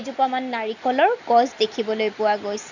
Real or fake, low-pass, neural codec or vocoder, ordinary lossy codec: real; 7.2 kHz; none; none